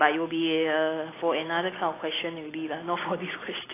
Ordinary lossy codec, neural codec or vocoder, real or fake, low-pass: AAC, 16 kbps; none; real; 3.6 kHz